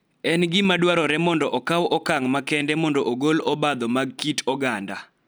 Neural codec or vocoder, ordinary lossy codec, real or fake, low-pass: none; none; real; none